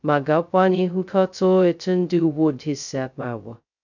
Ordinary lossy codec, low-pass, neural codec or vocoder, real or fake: none; 7.2 kHz; codec, 16 kHz, 0.2 kbps, FocalCodec; fake